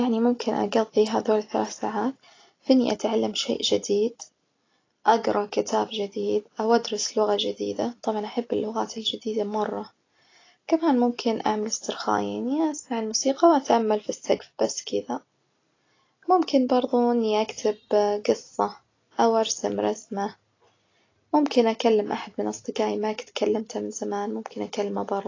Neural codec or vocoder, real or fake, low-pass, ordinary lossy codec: none; real; 7.2 kHz; AAC, 32 kbps